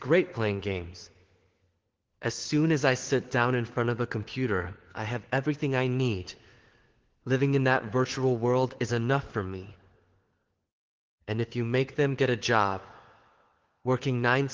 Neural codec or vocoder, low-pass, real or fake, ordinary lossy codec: codec, 16 kHz, 4 kbps, FunCodec, trained on LibriTTS, 50 frames a second; 7.2 kHz; fake; Opus, 16 kbps